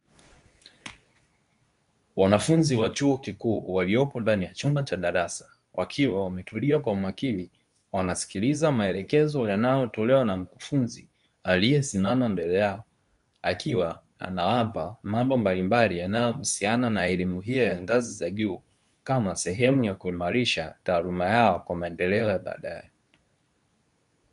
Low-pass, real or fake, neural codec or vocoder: 10.8 kHz; fake; codec, 24 kHz, 0.9 kbps, WavTokenizer, medium speech release version 2